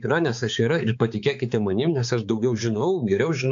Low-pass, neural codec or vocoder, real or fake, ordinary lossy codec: 7.2 kHz; codec, 16 kHz, 4 kbps, X-Codec, HuBERT features, trained on balanced general audio; fake; AAC, 64 kbps